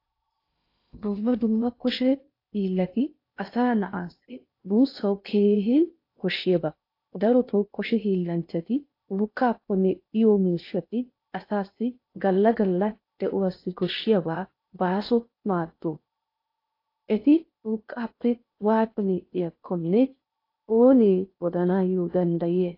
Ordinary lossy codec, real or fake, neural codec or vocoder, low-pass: AAC, 32 kbps; fake; codec, 16 kHz in and 24 kHz out, 0.6 kbps, FocalCodec, streaming, 2048 codes; 5.4 kHz